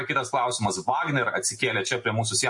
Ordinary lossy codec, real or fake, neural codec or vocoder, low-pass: MP3, 48 kbps; real; none; 10.8 kHz